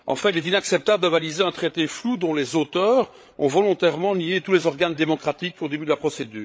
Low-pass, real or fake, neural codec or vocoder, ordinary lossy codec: none; fake; codec, 16 kHz, 8 kbps, FreqCodec, larger model; none